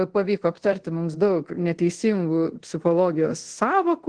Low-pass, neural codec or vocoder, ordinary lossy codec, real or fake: 9.9 kHz; codec, 24 kHz, 0.5 kbps, DualCodec; Opus, 16 kbps; fake